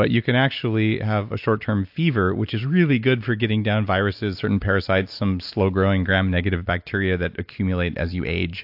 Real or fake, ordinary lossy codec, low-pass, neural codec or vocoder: real; AAC, 48 kbps; 5.4 kHz; none